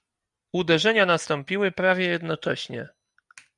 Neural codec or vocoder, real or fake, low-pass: none; real; 10.8 kHz